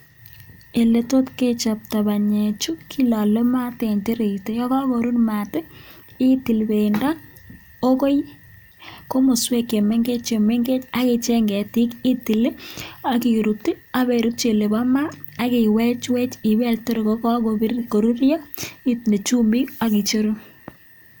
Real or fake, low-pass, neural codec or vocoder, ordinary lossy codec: real; none; none; none